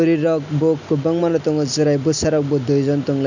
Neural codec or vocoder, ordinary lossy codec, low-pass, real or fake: none; none; 7.2 kHz; real